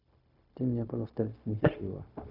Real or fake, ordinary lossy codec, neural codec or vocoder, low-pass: fake; none; codec, 16 kHz, 0.4 kbps, LongCat-Audio-Codec; 5.4 kHz